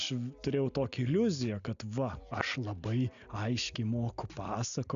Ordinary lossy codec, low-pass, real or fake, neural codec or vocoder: AAC, 96 kbps; 7.2 kHz; real; none